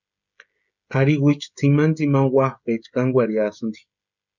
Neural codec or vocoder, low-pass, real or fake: codec, 16 kHz, 16 kbps, FreqCodec, smaller model; 7.2 kHz; fake